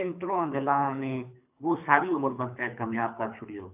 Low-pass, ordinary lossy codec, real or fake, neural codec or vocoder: 3.6 kHz; AAC, 32 kbps; fake; codec, 24 kHz, 3 kbps, HILCodec